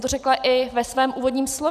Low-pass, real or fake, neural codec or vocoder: 14.4 kHz; real; none